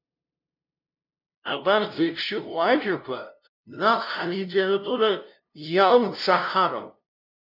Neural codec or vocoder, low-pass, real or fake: codec, 16 kHz, 0.5 kbps, FunCodec, trained on LibriTTS, 25 frames a second; 5.4 kHz; fake